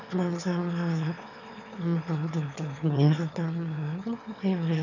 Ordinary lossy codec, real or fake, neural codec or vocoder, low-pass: none; fake; autoencoder, 22.05 kHz, a latent of 192 numbers a frame, VITS, trained on one speaker; 7.2 kHz